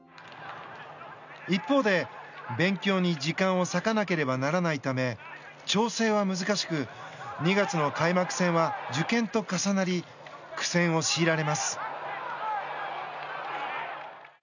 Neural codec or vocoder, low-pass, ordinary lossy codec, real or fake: none; 7.2 kHz; none; real